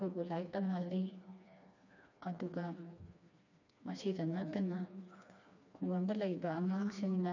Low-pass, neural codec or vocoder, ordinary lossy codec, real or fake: 7.2 kHz; codec, 16 kHz, 2 kbps, FreqCodec, smaller model; none; fake